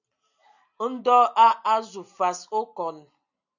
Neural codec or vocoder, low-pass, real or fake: none; 7.2 kHz; real